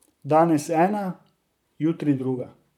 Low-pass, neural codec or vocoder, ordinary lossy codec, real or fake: 19.8 kHz; vocoder, 44.1 kHz, 128 mel bands, Pupu-Vocoder; none; fake